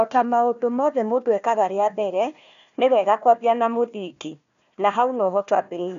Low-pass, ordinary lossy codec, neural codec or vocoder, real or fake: 7.2 kHz; none; codec, 16 kHz, 1 kbps, FunCodec, trained on Chinese and English, 50 frames a second; fake